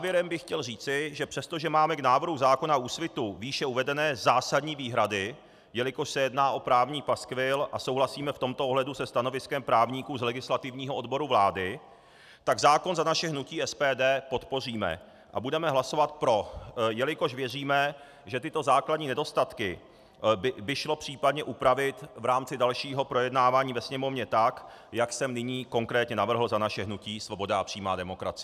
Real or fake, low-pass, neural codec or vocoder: real; 14.4 kHz; none